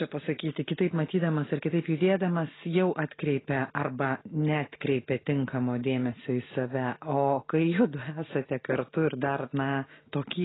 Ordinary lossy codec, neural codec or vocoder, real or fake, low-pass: AAC, 16 kbps; none; real; 7.2 kHz